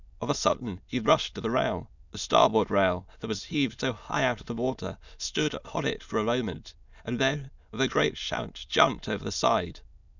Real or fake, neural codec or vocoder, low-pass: fake; autoencoder, 22.05 kHz, a latent of 192 numbers a frame, VITS, trained on many speakers; 7.2 kHz